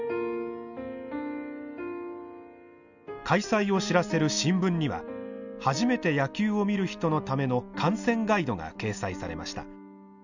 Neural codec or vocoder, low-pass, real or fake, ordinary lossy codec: none; 7.2 kHz; real; none